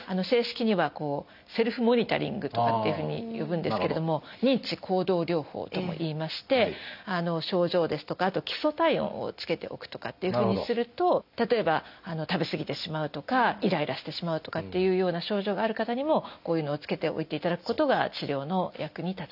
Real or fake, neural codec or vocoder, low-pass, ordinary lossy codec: real; none; 5.4 kHz; none